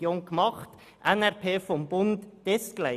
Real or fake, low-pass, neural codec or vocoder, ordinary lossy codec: real; 14.4 kHz; none; none